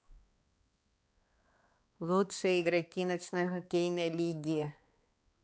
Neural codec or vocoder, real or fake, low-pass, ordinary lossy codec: codec, 16 kHz, 2 kbps, X-Codec, HuBERT features, trained on balanced general audio; fake; none; none